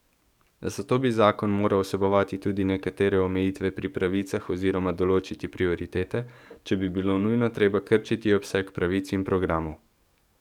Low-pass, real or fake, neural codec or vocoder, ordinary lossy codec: 19.8 kHz; fake; codec, 44.1 kHz, 7.8 kbps, DAC; none